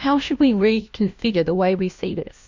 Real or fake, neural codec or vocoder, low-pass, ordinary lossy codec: fake; codec, 16 kHz, 1 kbps, FunCodec, trained on LibriTTS, 50 frames a second; 7.2 kHz; MP3, 64 kbps